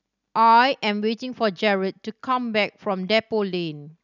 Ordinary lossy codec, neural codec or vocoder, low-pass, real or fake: none; none; 7.2 kHz; real